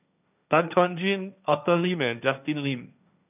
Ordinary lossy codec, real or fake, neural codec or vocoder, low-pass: none; fake; codec, 16 kHz, 1.1 kbps, Voila-Tokenizer; 3.6 kHz